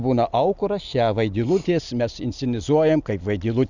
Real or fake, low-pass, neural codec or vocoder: real; 7.2 kHz; none